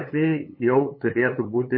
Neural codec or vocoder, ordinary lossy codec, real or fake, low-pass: codec, 16 kHz, 8 kbps, FunCodec, trained on LibriTTS, 25 frames a second; MP3, 24 kbps; fake; 5.4 kHz